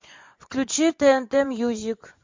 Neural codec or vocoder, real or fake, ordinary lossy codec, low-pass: vocoder, 24 kHz, 100 mel bands, Vocos; fake; MP3, 48 kbps; 7.2 kHz